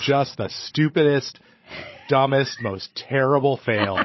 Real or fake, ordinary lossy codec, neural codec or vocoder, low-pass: fake; MP3, 24 kbps; codec, 16 kHz, 16 kbps, FreqCodec, larger model; 7.2 kHz